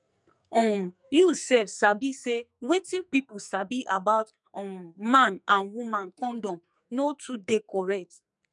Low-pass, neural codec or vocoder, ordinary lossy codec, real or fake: 10.8 kHz; codec, 44.1 kHz, 2.6 kbps, SNAC; MP3, 96 kbps; fake